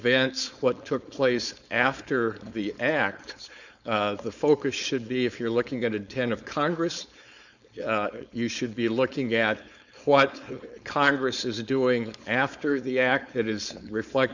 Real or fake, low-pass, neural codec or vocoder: fake; 7.2 kHz; codec, 16 kHz, 4.8 kbps, FACodec